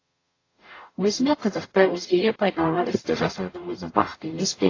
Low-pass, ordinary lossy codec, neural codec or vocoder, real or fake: 7.2 kHz; AAC, 32 kbps; codec, 44.1 kHz, 0.9 kbps, DAC; fake